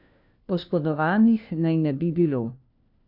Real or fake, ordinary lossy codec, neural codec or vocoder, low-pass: fake; none; codec, 16 kHz, 1 kbps, FunCodec, trained on LibriTTS, 50 frames a second; 5.4 kHz